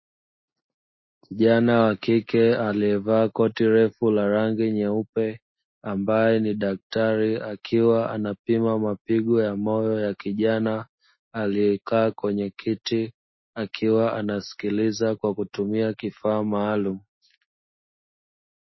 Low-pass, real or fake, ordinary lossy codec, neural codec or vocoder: 7.2 kHz; real; MP3, 24 kbps; none